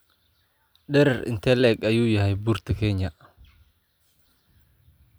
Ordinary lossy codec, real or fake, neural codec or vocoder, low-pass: none; real; none; none